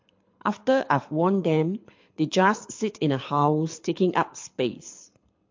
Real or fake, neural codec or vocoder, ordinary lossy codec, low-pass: fake; codec, 24 kHz, 6 kbps, HILCodec; MP3, 48 kbps; 7.2 kHz